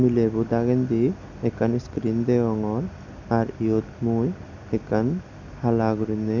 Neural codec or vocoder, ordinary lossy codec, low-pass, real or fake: none; none; 7.2 kHz; real